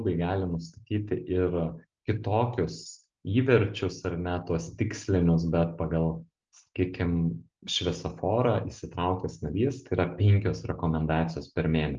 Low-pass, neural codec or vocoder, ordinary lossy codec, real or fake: 7.2 kHz; none; Opus, 24 kbps; real